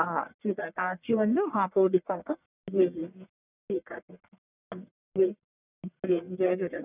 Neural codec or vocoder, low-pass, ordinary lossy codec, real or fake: codec, 44.1 kHz, 1.7 kbps, Pupu-Codec; 3.6 kHz; none; fake